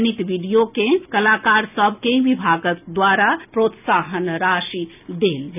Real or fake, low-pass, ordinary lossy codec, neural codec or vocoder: real; 3.6 kHz; none; none